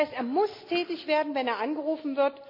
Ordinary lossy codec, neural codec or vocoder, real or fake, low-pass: none; none; real; 5.4 kHz